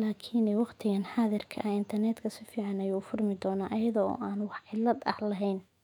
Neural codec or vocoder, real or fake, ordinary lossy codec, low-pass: autoencoder, 48 kHz, 128 numbers a frame, DAC-VAE, trained on Japanese speech; fake; none; 19.8 kHz